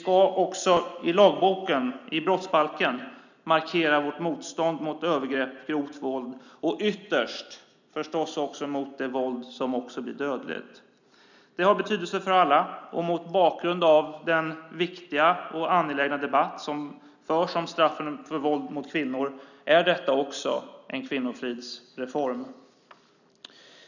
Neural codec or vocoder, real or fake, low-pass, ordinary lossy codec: none; real; 7.2 kHz; none